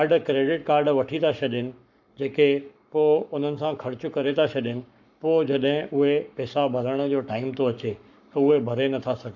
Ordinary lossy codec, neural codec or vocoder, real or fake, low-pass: none; codec, 44.1 kHz, 7.8 kbps, Pupu-Codec; fake; 7.2 kHz